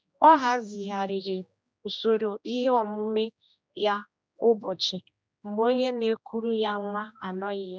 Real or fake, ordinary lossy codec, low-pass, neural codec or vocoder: fake; none; none; codec, 16 kHz, 1 kbps, X-Codec, HuBERT features, trained on general audio